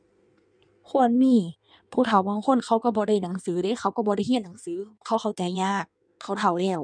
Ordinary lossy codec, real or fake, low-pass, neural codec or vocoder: none; fake; 9.9 kHz; codec, 16 kHz in and 24 kHz out, 1.1 kbps, FireRedTTS-2 codec